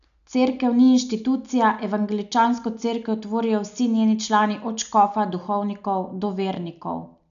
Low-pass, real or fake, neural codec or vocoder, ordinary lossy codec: 7.2 kHz; real; none; none